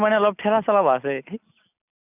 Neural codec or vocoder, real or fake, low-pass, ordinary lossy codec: none; real; 3.6 kHz; none